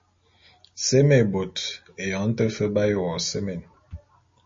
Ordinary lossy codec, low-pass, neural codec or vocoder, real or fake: MP3, 32 kbps; 7.2 kHz; none; real